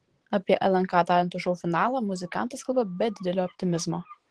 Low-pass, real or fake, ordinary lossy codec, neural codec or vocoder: 10.8 kHz; fake; Opus, 16 kbps; vocoder, 44.1 kHz, 128 mel bands every 512 samples, BigVGAN v2